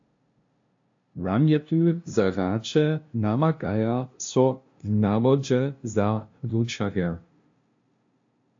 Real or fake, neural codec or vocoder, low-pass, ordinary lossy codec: fake; codec, 16 kHz, 0.5 kbps, FunCodec, trained on LibriTTS, 25 frames a second; 7.2 kHz; AAC, 48 kbps